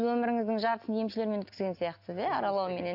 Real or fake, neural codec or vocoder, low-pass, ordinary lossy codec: real; none; 5.4 kHz; AAC, 48 kbps